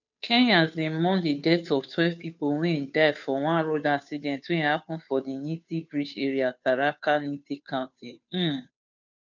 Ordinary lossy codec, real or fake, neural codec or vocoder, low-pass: none; fake; codec, 16 kHz, 2 kbps, FunCodec, trained on Chinese and English, 25 frames a second; 7.2 kHz